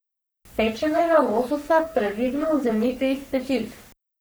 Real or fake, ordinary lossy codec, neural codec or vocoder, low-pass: fake; none; codec, 44.1 kHz, 3.4 kbps, Pupu-Codec; none